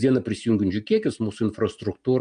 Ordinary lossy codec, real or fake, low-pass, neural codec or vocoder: AAC, 96 kbps; real; 9.9 kHz; none